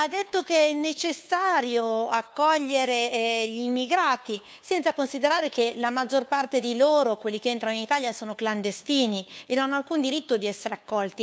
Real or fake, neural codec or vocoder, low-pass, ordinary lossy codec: fake; codec, 16 kHz, 2 kbps, FunCodec, trained on LibriTTS, 25 frames a second; none; none